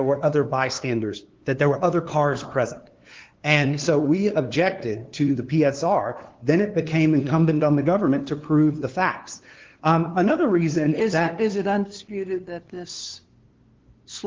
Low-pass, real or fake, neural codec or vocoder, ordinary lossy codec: 7.2 kHz; fake; codec, 16 kHz, 2 kbps, FunCodec, trained on LibriTTS, 25 frames a second; Opus, 16 kbps